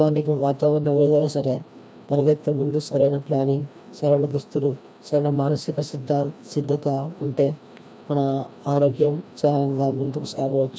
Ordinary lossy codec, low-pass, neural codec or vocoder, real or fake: none; none; codec, 16 kHz, 1 kbps, FreqCodec, larger model; fake